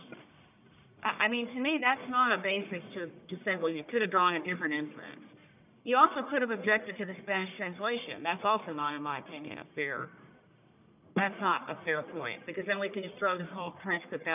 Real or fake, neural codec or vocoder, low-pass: fake; codec, 44.1 kHz, 1.7 kbps, Pupu-Codec; 3.6 kHz